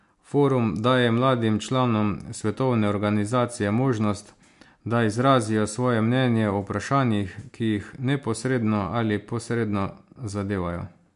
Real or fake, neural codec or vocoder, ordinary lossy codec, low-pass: real; none; MP3, 64 kbps; 10.8 kHz